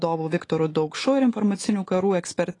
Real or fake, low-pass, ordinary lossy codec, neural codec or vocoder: fake; 10.8 kHz; AAC, 32 kbps; autoencoder, 48 kHz, 128 numbers a frame, DAC-VAE, trained on Japanese speech